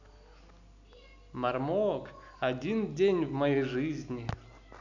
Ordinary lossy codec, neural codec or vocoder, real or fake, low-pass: none; none; real; 7.2 kHz